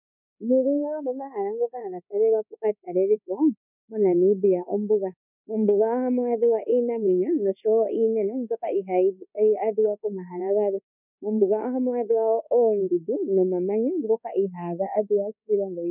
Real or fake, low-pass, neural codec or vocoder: fake; 3.6 kHz; codec, 24 kHz, 1.2 kbps, DualCodec